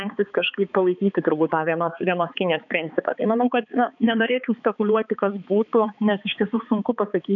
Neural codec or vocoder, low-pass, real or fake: codec, 16 kHz, 4 kbps, X-Codec, HuBERT features, trained on balanced general audio; 7.2 kHz; fake